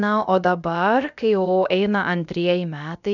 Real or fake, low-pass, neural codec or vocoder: fake; 7.2 kHz; codec, 16 kHz, about 1 kbps, DyCAST, with the encoder's durations